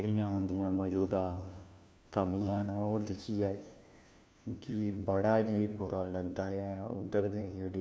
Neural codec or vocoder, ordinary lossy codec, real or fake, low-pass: codec, 16 kHz, 1 kbps, FunCodec, trained on LibriTTS, 50 frames a second; none; fake; none